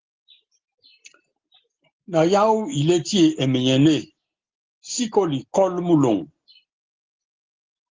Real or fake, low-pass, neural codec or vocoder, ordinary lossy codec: real; 7.2 kHz; none; Opus, 16 kbps